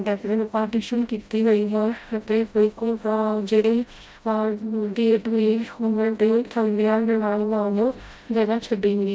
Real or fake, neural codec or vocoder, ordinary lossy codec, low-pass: fake; codec, 16 kHz, 0.5 kbps, FreqCodec, smaller model; none; none